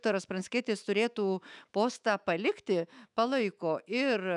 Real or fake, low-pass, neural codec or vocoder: fake; 10.8 kHz; autoencoder, 48 kHz, 128 numbers a frame, DAC-VAE, trained on Japanese speech